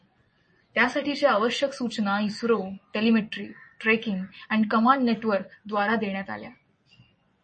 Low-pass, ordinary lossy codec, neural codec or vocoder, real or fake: 10.8 kHz; MP3, 32 kbps; vocoder, 24 kHz, 100 mel bands, Vocos; fake